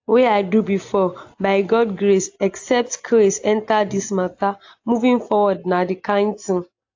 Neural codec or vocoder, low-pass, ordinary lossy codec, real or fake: none; 7.2 kHz; AAC, 48 kbps; real